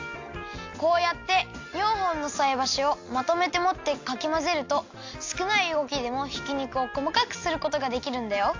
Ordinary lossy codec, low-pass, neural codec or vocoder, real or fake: AAC, 48 kbps; 7.2 kHz; none; real